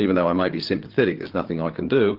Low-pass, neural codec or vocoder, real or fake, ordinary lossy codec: 5.4 kHz; codec, 16 kHz, 8 kbps, FreqCodec, larger model; fake; Opus, 16 kbps